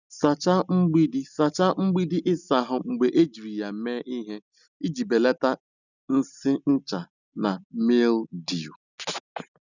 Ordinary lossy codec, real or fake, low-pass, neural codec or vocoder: none; real; 7.2 kHz; none